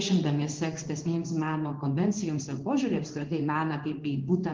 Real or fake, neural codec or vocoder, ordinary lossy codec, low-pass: fake; codec, 16 kHz in and 24 kHz out, 1 kbps, XY-Tokenizer; Opus, 16 kbps; 7.2 kHz